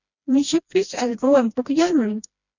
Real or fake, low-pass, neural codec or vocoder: fake; 7.2 kHz; codec, 16 kHz, 1 kbps, FreqCodec, smaller model